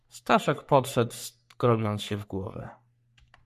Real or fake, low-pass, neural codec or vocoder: fake; 14.4 kHz; codec, 44.1 kHz, 3.4 kbps, Pupu-Codec